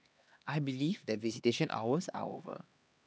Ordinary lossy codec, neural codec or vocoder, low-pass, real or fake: none; codec, 16 kHz, 2 kbps, X-Codec, HuBERT features, trained on LibriSpeech; none; fake